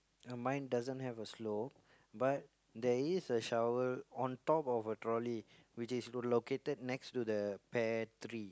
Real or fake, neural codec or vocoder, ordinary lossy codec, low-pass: real; none; none; none